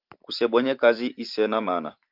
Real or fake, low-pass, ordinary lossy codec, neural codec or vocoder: fake; 5.4 kHz; Opus, 24 kbps; vocoder, 44.1 kHz, 128 mel bands every 512 samples, BigVGAN v2